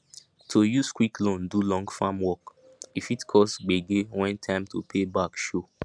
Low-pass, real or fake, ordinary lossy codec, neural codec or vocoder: 9.9 kHz; real; none; none